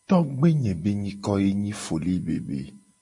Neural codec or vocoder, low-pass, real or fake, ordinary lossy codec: none; 10.8 kHz; real; MP3, 48 kbps